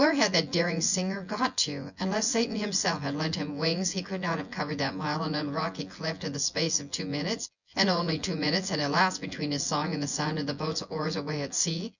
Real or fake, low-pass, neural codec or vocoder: fake; 7.2 kHz; vocoder, 24 kHz, 100 mel bands, Vocos